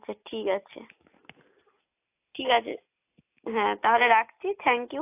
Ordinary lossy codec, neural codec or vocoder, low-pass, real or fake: AAC, 24 kbps; none; 3.6 kHz; real